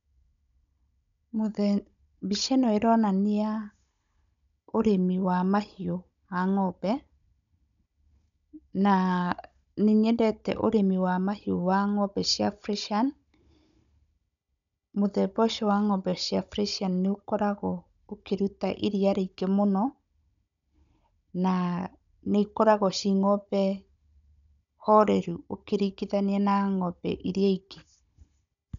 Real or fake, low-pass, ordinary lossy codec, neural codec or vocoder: fake; 7.2 kHz; none; codec, 16 kHz, 16 kbps, FunCodec, trained on Chinese and English, 50 frames a second